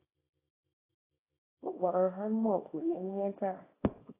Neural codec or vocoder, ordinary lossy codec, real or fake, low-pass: codec, 24 kHz, 0.9 kbps, WavTokenizer, small release; AAC, 16 kbps; fake; 3.6 kHz